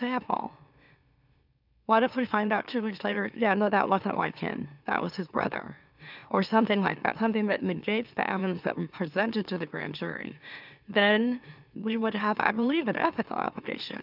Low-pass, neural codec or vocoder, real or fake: 5.4 kHz; autoencoder, 44.1 kHz, a latent of 192 numbers a frame, MeloTTS; fake